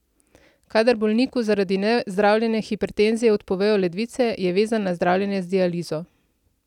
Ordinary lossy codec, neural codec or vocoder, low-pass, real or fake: none; none; 19.8 kHz; real